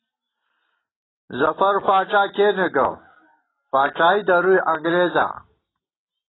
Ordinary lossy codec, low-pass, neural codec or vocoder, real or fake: AAC, 16 kbps; 7.2 kHz; none; real